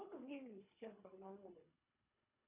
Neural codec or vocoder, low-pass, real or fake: codec, 24 kHz, 3 kbps, HILCodec; 3.6 kHz; fake